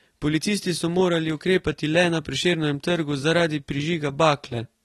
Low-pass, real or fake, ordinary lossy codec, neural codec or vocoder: 19.8 kHz; real; AAC, 32 kbps; none